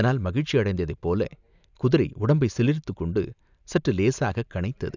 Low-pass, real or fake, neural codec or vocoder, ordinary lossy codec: 7.2 kHz; real; none; none